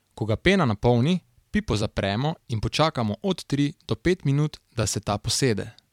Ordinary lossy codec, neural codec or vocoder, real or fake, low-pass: MP3, 96 kbps; vocoder, 44.1 kHz, 128 mel bands every 256 samples, BigVGAN v2; fake; 19.8 kHz